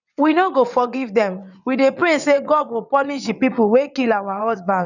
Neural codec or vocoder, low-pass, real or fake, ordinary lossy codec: vocoder, 22.05 kHz, 80 mel bands, WaveNeXt; 7.2 kHz; fake; none